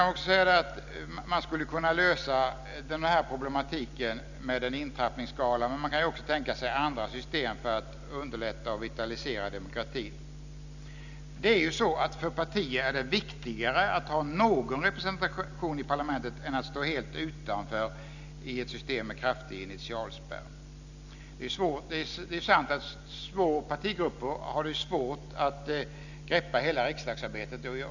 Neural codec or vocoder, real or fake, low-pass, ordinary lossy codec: none; real; 7.2 kHz; none